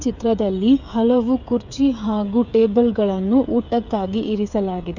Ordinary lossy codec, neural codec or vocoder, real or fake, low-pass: none; codec, 16 kHz, 8 kbps, FreqCodec, smaller model; fake; 7.2 kHz